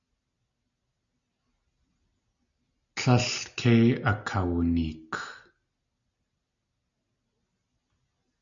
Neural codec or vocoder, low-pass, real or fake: none; 7.2 kHz; real